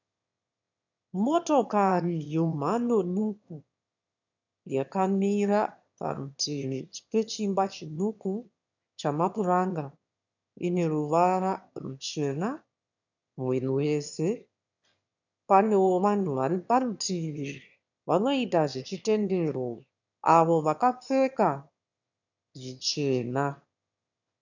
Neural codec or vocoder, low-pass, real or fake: autoencoder, 22.05 kHz, a latent of 192 numbers a frame, VITS, trained on one speaker; 7.2 kHz; fake